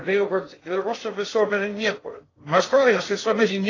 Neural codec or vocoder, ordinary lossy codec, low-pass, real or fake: codec, 16 kHz in and 24 kHz out, 0.6 kbps, FocalCodec, streaming, 2048 codes; AAC, 32 kbps; 7.2 kHz; fake